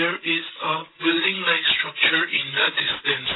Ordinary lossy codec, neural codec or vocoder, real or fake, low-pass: AAC, 16 kbps; codec, 16 kHz, 16 kbps, FreqCodec, larger model; fake; 7.2 kHz